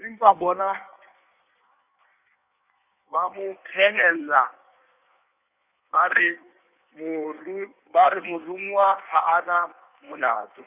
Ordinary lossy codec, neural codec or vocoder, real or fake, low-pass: none; codec, 16 kHz in and 24 kHz out, 1.1 kbps, FireRedTTS-2 codec; fake; 3.6 kHz